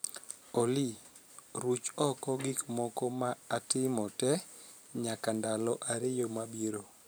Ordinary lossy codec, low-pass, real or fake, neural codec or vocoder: none; none; fake; vocoder, 44.1 kHz, 128 mel bands every 512 samples, BigVGAN v2